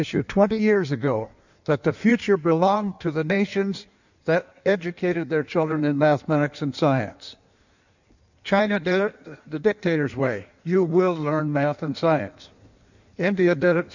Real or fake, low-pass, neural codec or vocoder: fake; 7.2 kHz; codec, 16 kHz in and 24 kHz out, 1.1 kbps, FireRedTTS-2 codec